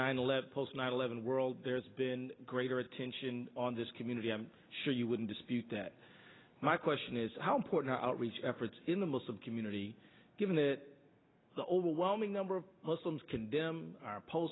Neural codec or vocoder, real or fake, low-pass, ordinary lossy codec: none; real; 7.2 kHz; AAC, 16 kbps